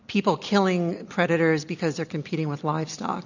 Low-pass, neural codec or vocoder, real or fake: 7.2 kHz; none; real